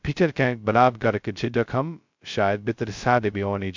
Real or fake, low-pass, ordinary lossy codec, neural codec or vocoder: fake; 7.2 kHz; MP3, 64 kbps; codec, 16 kHz, 0.2 kbps, FocalCodec